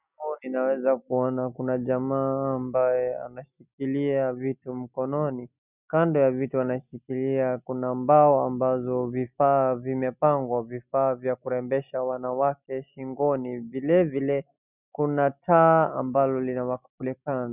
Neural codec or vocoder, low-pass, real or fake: none; 3.6 kHz; real